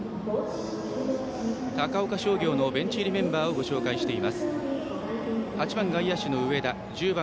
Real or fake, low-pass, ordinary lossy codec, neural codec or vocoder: real; none; none; none